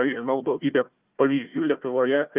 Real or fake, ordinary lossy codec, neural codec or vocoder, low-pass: fake; Opus, 32 kbps; codec, 16 kHz, 1 kbps, FunCodec, trained on Chinese and English, 50 frames a second; 3.6 kHz